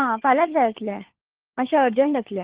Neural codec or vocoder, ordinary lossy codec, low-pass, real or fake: codec, 16 kHz, 4 kbps, FunCodec, trained on LibriTTS, 50 frames a second; Opus, 16 kbps; 3.6 kHz; fake